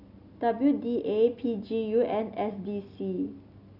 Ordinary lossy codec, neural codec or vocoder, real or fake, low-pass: none; none; real; 5.4 kHz